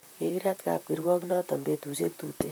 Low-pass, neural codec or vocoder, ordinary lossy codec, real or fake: none; vocoder, 44.1 kHz, 128 mel bands, Pupu-Vocoder; none; fake